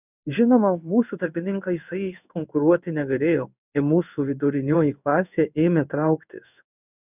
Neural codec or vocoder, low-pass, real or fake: codec, 16 kHz in and 24 kHz out, 1 kbps, XY-Tokenizer; 3.6 kHz; fake